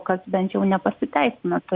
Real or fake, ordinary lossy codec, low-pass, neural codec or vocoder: real; Opus, 64 kbps; 5.4 kHz; none